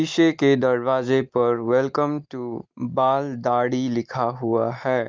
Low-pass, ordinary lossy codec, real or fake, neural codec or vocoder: 7.2 kHz; Opus, 24 kbps; real; none